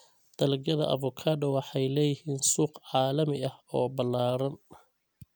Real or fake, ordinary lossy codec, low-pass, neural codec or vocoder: real; none; none; none